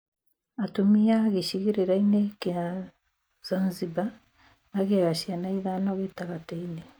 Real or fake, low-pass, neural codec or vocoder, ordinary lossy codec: real; none; none; none